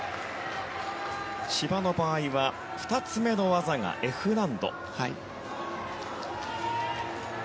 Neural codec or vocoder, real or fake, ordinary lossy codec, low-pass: none; real; none; none